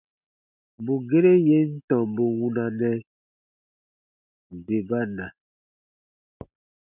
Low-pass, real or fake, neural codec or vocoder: 3.6 kHz; real; none